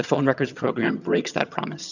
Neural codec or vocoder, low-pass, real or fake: vocoder, 22.05 kHz, 80 mel bands, HiFi-GAN; 7.2 kHz; fake